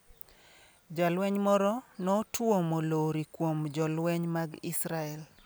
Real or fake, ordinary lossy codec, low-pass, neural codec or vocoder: real; none; none; none